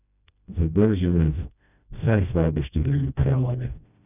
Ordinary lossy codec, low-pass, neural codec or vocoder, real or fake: none; 3.6 kHz; codec, 16 kHz, 1 kbps, FreqCodec, smaller model; fake